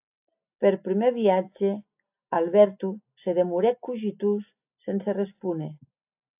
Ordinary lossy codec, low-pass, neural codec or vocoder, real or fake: AAC, 32 kbps; 3.6 kHz; none; real